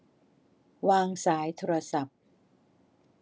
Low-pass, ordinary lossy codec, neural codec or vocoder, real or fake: none; none; none; real